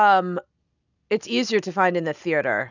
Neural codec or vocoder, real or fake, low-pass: none; real; 7.2 kHz